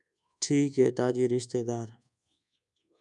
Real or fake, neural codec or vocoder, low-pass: fake; codec, 24 kHz, 1.2 kbps, DualCodec; 10.8 kHz